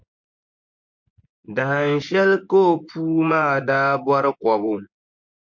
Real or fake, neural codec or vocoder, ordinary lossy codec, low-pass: fake; vocoder, 24 kHz, 100 mel bands, Vocos; MP3, 48 kbps; 7.2 kHz